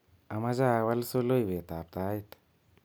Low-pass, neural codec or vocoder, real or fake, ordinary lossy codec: none; none; real; none